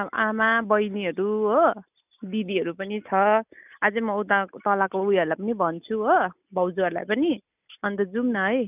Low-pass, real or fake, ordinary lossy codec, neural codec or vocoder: 3.6 kHz; real; none; none